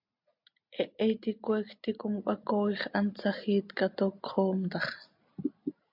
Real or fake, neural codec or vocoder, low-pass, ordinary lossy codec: real; none; 5.4 kHz; MP3, 48 kbps